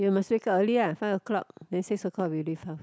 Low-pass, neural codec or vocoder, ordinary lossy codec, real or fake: none; none; none; real